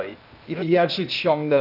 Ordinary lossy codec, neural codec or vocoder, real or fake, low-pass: none; codec, 16 kHz, 0.8 kbps, ZipCodec; fake; 5.4 kHz